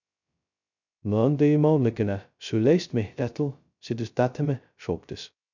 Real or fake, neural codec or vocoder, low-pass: fake; codec, 16 kHz, 0.2 kbps, FocalCodec; 7.2 kHz